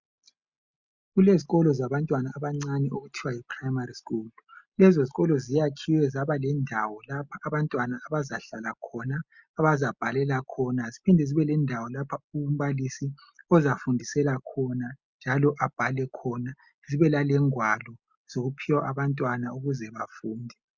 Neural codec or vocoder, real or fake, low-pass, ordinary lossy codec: none; real; 7.2 kHz; Opus, 64 kbps